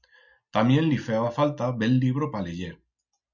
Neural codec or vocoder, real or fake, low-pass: none; real; 7.2 kHz